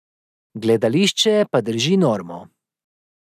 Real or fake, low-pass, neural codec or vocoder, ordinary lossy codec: real; 14.4 kHz; none; none